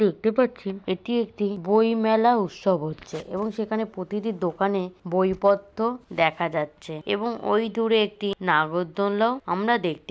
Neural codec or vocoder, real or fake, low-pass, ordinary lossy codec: none; real; none; none